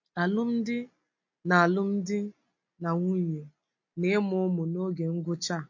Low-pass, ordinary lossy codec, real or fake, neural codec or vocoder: 7.2 kHz; MP3, 48 kbps; real; none